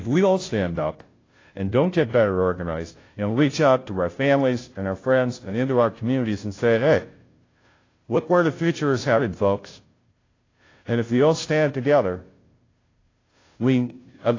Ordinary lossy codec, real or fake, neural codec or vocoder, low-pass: AAC, 32 kbps; fake; codec, 16 kHz, 0.5 kbps, FunCodec, trained on Chinese and English, 25 frames a second; 7.2 kHz